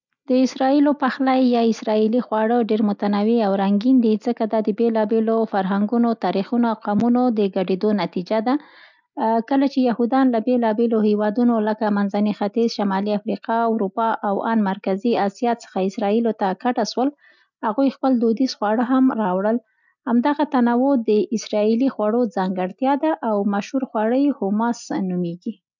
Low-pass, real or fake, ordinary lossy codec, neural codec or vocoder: 7.2 kHz; real; none; none